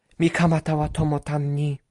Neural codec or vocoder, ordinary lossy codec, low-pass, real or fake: none; Opus, 64 kbps; 10.8 kHz; real